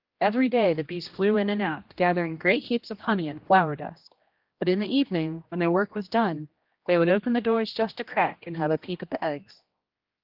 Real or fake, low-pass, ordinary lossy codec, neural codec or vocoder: fake; 5.4 kHz; Opus, 32 kbps; codec, 16 kHz, 1 kbps, X-Codec, HuBERT features, trained on general audio